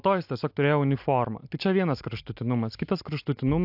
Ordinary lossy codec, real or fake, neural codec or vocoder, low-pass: AAC, 48 kbps; real; none; 5.4 kHz